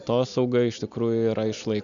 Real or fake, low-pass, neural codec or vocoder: real; 7.2 kHz; none